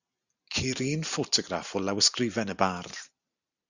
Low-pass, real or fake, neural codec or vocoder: 7.2 kHz; real; none